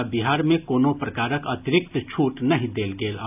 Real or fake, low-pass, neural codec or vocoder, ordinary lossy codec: real; 3.6 kHz; none; AAC, 32 kbps